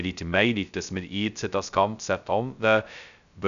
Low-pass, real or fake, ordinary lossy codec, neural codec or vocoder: 7.2 kHz; fake; none; codec, 16 kHz, 0.2 kbps, FocalCodec